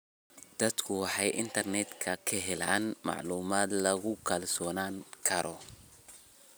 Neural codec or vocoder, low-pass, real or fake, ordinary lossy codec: none; none; real; none